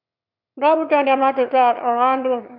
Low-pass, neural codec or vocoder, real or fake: 5.4 kHz; autoencoder, 22.05 kHz, a latent of 192 numbers a frame, VITS, trained on one speaker; fake